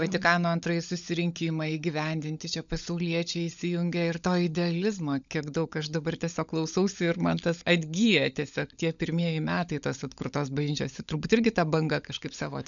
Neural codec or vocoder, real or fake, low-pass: codec, 16 kHz, 16 kbps, FunCodec, trained on LibriTTS, 50 frames a second; fake; 7.2 kHz